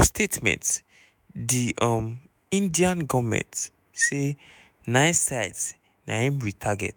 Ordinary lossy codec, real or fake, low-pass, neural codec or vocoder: none; fake; none; autoencoder, 48 kHz, 128 numbers a frame, DAC-VAE, trained on Japanese speech